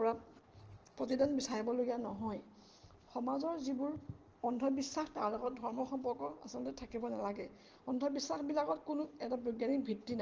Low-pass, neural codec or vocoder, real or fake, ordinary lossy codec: 7.2 kHz; none; real; Opus, 16 kbps